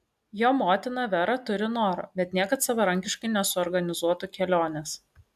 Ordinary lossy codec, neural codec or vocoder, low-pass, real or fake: AAC, 96 kbps; none; 14.4 kHz; real